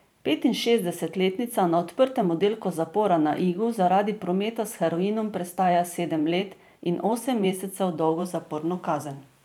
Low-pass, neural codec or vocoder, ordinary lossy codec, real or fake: none; vocoder, 44.1 kHz, 128 mel bands every 512 samples, BigVGAN v2; none; fake